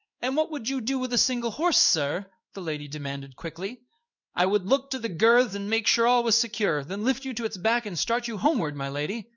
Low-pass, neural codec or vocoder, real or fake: 7.2 kHz; codec, 16 kHz in and 24 kHz out, 1 kbps, XY-Tokenizer; fake